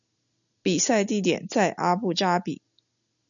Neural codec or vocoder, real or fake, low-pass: none; real; 7.2 kHz